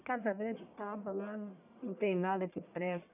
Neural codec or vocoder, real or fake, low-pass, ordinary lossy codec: codec, 44.1 kHz, 1.7 kbps, Pupu-Codec; fake; 3.6 kHz; none